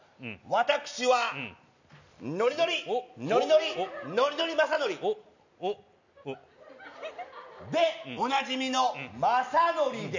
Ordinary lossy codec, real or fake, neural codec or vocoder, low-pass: none; real; none; 7.2 kHz